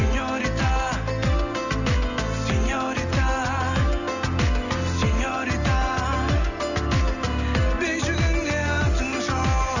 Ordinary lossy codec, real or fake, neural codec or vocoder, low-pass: none; real; none; 7.2 kHz